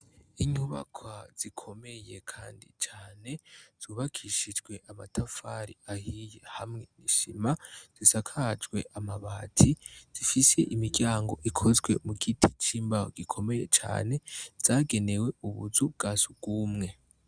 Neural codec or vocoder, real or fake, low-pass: none; real; 9.9 kHz